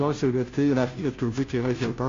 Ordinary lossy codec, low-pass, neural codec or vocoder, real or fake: MP3, 48 kbps; 7.2 kHz; codec, 16 kHz, 0.5 kbps, FunCodec, trained on Chinese and English, 25 frames a second; fake